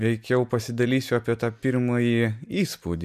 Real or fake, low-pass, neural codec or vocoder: real; 14.4 kHz; none